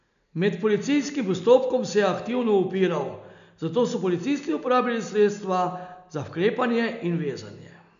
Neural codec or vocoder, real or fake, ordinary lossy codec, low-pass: none; real; none; 7.2 kHz